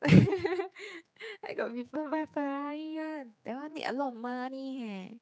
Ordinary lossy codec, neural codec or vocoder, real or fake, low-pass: none; codec, 16 kHz, 4 kbps, X-Codec, HuBERT features, trained on general audio; fake; none